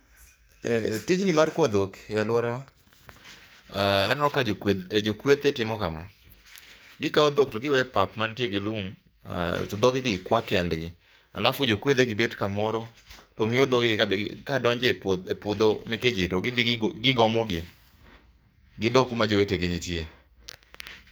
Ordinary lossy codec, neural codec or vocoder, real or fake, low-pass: none; codec, 44.1 kHz, 2.6 kbps, SNAC; fake; none